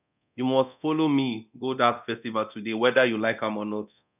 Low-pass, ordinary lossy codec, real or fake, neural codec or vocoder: 3.6 kHz; none; fake; codec, 24 kHz, 0.9 kbps, DualCodec